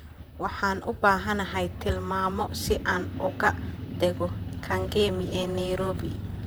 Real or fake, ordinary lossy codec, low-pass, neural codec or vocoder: fake; none; none; vocoder, 44.1 kHz, 128 mel bands, Pupu-Vocoder